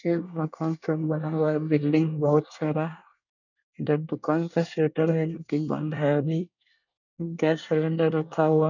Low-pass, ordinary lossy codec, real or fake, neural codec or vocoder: 7.2 kHz; none; fake; codec, 24 kHz, 1 kbps, SNAC